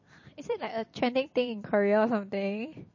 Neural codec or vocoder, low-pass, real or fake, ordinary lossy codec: vocoder, 44.1 kHz, 128 mel bands every 256 samples, BigVGAN v2; 7.2 kHz; fake; MP3, 32 kbps